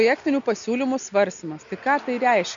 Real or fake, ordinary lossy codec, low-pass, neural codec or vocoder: real; MP3, 64 kbps; 7.2 kHz; none